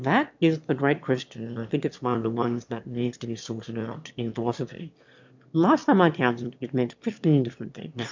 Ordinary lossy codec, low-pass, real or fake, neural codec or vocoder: MP3, 64 kbps; 7.2 kHz; fake; autoencoder, 22.05 kHz, a latent of 192 numbers a frame, VITS, trained on one speaker